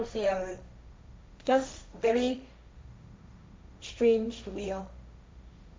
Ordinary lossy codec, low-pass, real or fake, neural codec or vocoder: none; none; fake; codec, 16 kHz, 1.1 kbps, Voila-Tokenizer